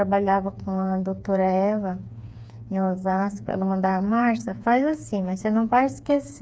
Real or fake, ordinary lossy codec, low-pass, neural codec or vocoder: fake; none; none; codec, 16 kHz, 4 kbps, FreqCodec, smaller model